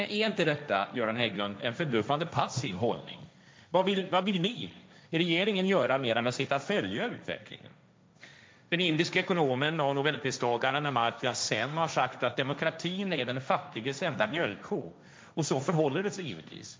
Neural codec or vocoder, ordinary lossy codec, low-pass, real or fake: codec, 16 kHz, 1.1 kbps, Voila-Tokenizer; none; none; fake